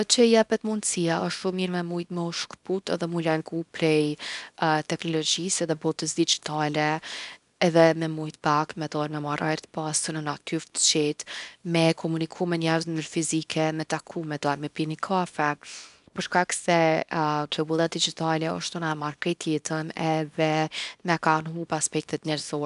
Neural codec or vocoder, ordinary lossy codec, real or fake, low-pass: codec, 24 kHz, 0.9 kbps, WavTokenizer, small release; none; fake; 10.8 kHz